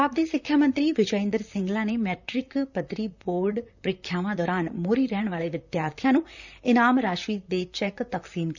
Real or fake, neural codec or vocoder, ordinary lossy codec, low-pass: fake; vocoder, 44.1 kHz, 128 mel bands, Pupu-Vocoder; none; 7.2 kHz